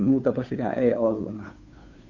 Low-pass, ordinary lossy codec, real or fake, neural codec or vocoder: 7.2 kHz; none; fake; codec, 16 kHz, 2 kbps, FunCodec, trained on Chinese and English, 25 frames a second